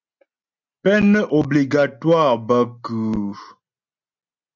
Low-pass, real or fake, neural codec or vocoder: 7.2 kHz; real; none